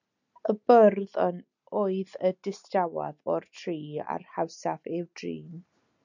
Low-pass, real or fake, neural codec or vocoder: 7.2 kHz; real; none